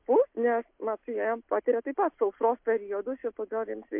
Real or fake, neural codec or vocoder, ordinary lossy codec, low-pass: real; none; MP3, 32 kbps; 3.6 kHz